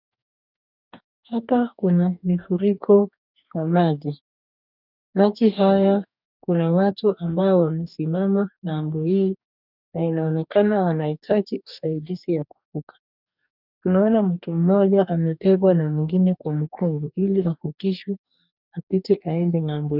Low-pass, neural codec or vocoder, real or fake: 5.4 kHz; codec, 44.1 kHz, 2.6 kbps, DAC; fake